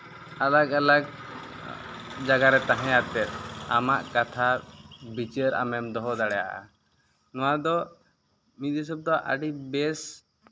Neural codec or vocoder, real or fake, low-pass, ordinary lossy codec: none; real; none; none